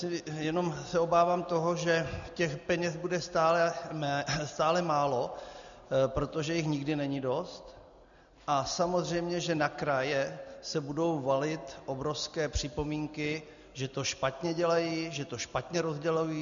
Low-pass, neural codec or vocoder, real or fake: 7.2 kHz; none; real